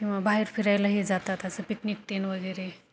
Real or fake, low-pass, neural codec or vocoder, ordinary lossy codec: real; none; none; none